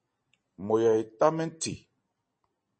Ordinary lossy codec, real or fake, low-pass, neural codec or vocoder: MP3, 32 kbps; real; 9.9 kHz; none